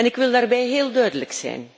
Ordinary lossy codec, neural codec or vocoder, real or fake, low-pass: none; none; real; none